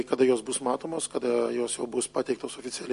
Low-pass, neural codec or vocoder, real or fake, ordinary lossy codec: 10.8 kHz; none; real; MP3, 48 kbps